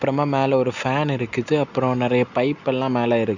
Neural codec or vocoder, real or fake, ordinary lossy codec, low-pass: none; real; none; 7.2 kHz